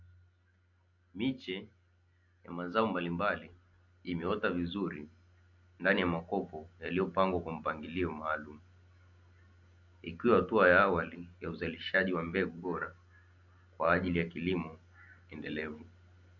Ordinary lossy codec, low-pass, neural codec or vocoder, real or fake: MP3, 48 kbps; 7.2 kHz; none; real